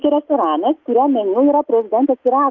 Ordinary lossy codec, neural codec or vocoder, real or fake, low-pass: Opus, 32 kbps; none; real; 7.2 kHz